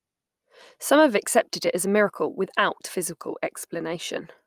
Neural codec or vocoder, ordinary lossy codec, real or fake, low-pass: none; Opus, 32 kbps; real; 14.4 kHz